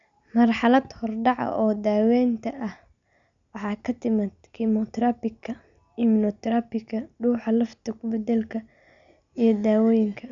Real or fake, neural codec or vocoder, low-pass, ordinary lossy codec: real; none; 7.2 kHz; none